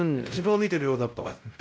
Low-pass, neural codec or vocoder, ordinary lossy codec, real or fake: none; codec, 16 kHz, 0.5 kbps, X-Codec, WavLM features, trained on Multilingual LibriSpeech; none; fake